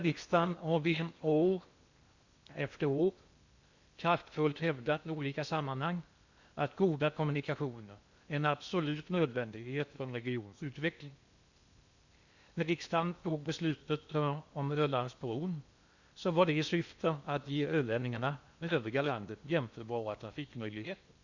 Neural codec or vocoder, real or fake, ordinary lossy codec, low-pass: codec, 16 kHz in and 24 kHz out, 0.8 kbps, FocalCodec, streaming, 65536 codes; fake; Opus, 64 kbps; 7.2 kHz